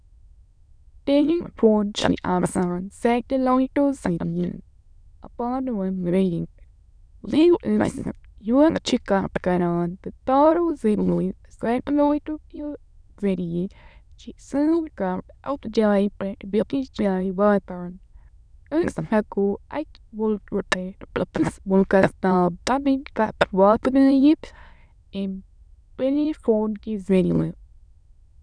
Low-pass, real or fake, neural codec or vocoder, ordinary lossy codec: 9.9 kHz; fake; autoencoder, 22.05 kHz, a latent of 192 numbers a frame, VITS, trained on many speakers; none